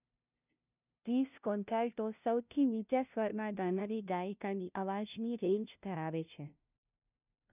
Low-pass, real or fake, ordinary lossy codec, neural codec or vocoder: 3.6 kHz; fake; none; codec, 16 kHz, 0.5 kbps, FunCodec, trained on LibriTTS, 25 frames a second